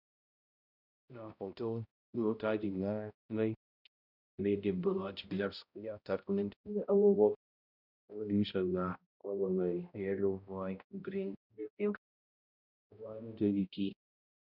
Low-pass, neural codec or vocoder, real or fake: 5.4 kHz; codec, 16 kHz, 0.5 kbps, X-Codec, HuBERT features, trained on balanced general audio; fake